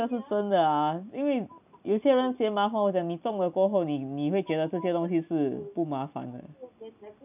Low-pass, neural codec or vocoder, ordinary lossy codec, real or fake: 3.6 kHz; none; none; real